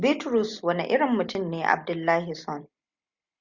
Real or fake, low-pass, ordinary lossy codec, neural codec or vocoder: real; 7.2 kHz; Opus, 64 kbps; none